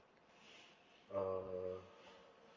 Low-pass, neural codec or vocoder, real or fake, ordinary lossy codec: 7.2 kHz; none; real; Opus, 32 kbps